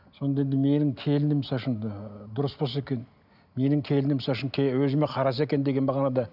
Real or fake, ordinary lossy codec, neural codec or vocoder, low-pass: real; none; none; 5.4 kHz